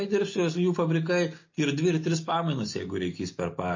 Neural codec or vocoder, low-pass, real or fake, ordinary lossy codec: none; 7.2 kHz; real; MP3, 32 kbps